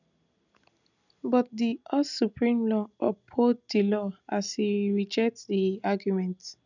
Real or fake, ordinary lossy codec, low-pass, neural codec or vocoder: real; none; 7.2 kHz; none